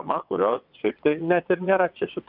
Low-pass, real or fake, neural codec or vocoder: 5.4 kHz; fake; vocoder, 22.05 kHz, 80 mel bands, WaveNeXt